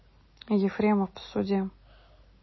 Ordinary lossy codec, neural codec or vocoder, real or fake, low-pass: MP3, 24 kbps; none; real; 7.2 kHz